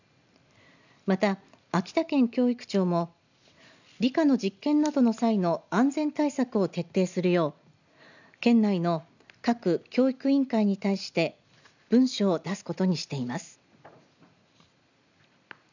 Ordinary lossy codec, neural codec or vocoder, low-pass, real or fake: none; none; 7.2 kHz; real